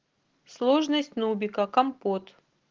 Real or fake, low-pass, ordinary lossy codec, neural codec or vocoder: real; 7.2 kHz; Opus, 16 kbps; none